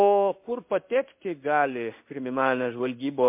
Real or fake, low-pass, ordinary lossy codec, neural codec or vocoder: fake; 3.6 kHz; MP3, 32 kbps; codec, 16 kHz in and 24 kHz out, 1 kbps, XY-Tokenizer